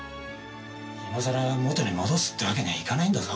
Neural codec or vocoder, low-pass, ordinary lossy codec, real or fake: none; none; none; real